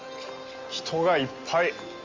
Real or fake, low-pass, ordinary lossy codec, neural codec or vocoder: real; 7.2 kHz; Opus, 32 kbps; none